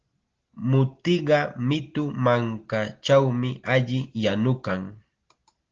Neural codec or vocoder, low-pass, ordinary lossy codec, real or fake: none; 7.2 kHz; Opus, 16 kbps; real